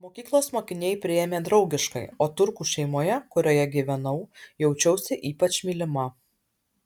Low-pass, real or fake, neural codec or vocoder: 19.8 kHz; real; none